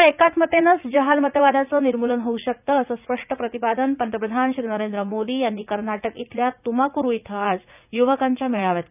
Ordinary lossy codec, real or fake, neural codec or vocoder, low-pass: none; fake; vocoder, 44.1 kHz, 80 mel bands, Vocos; 3.6 kHz